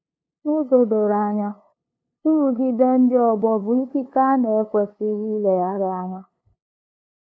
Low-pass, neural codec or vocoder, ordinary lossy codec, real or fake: none; codec, 16 kHz, 2 kbps, FunCodec, trained on LibriTTS, 25 frames a second; none; fake